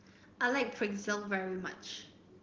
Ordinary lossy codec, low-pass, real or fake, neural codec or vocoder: Opus, 16 kbps; 7.2 kHz; real; none